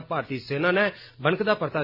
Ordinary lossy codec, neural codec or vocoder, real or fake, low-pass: none; none; real; 5.4 kHz